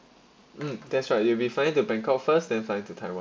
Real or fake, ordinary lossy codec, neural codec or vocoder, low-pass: real; none; none; none